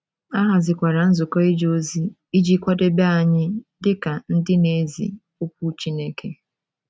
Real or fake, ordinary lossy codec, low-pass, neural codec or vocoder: real; none; none; none